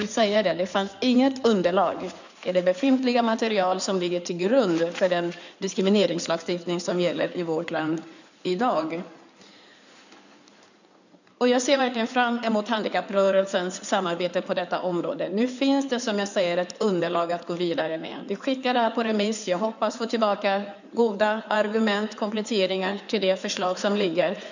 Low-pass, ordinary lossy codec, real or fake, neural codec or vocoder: 7.2 kHz; none; fake; codec, 16 kHz in and 24 kHz out, 2.2 kbps, FireRedTTS-2 codec